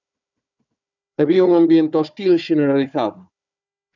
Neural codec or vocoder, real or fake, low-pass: codec, 16 kHz, 4 kbps, FunCodec, trained on Chinese and English, 50 frames a second; fake; 7.2 kHz